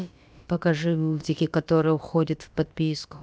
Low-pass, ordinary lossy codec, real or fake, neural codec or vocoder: none; none; fake; codec, 16 kHz, about 1 kbps, DyCAST, with the encoder's durations